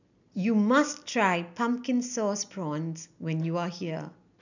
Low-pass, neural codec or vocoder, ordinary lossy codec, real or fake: 7.2 kHz; none; none; real